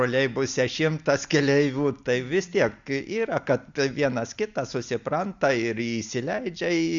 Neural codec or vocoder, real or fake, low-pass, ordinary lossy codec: none; real; 7.2 kHz; Opus, 64 kbps